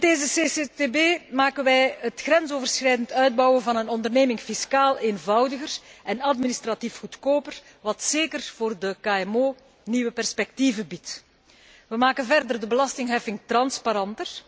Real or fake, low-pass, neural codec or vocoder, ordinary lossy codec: real; none; none; none